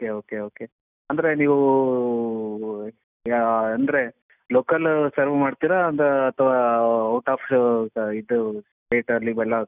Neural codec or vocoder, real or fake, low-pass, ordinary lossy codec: none; real; 3.6 kHz; none